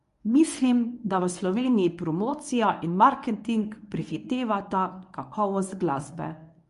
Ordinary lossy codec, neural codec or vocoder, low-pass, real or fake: MP3, 64 kbps; codec, 24 kHz, 0.9 kbps, WavTokenizer, medium speech release version 1; 10.8 kHz; fake